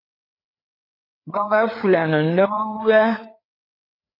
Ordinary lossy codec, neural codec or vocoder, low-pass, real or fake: AAC, 32 kbps; codec, 16 kHz, 4 kbps, FreqCodec, larger model; 5.4 kHz; fake